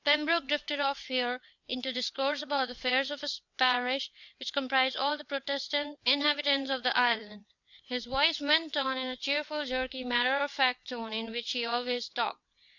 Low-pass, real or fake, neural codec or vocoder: 7.2 kHz; fake; vocoder, 22.05 kHz, 80 mel bands, WaveNeXt